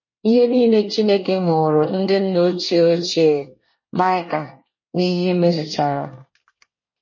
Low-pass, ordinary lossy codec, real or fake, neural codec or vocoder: 7.2 kHz; MP3, 32 kbps; fake; codec, 24 kHz, 1 kbps, SNAC